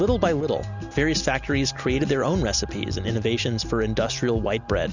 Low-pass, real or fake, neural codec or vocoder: 7.2 kHz; real; none